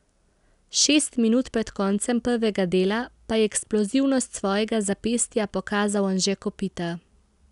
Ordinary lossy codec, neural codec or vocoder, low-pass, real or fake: none; none; 10.8 kHz; real